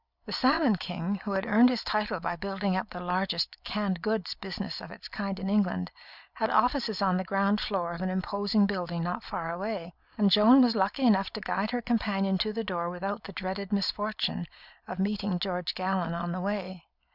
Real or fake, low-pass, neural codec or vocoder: real; 5.4 kHz; none